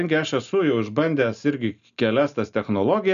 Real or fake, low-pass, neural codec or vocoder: real; 7.2 kHz; none